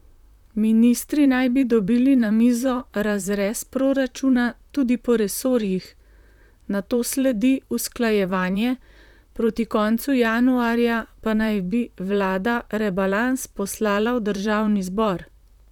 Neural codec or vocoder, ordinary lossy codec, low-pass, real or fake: vocoder, 44.1 kHz, 128 mel bands, Pupu-Vocoder; none; 19.8 kHz; fake